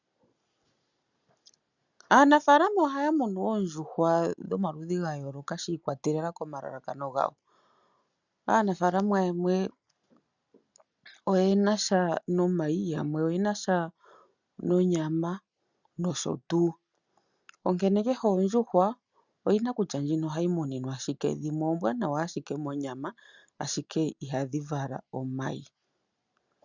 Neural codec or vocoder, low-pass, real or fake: none; 7.2 kHz; real